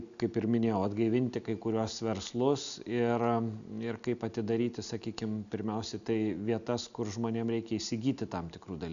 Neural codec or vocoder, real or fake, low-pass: none; real; 7.2 kHz